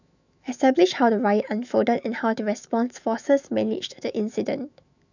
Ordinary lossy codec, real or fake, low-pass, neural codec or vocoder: none; fake; 7.2 kHz; autoencoder, 48 kHz, 128 numbers a frame, DAC-VAE, trained on Japanese speech